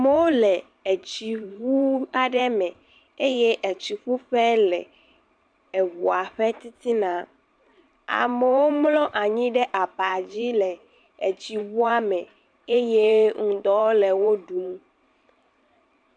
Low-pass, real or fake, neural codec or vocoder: 9.9 kHz; fake; vocoder, 44.1 kHz, 128 mel bands every 512 samples, BigVGAN v2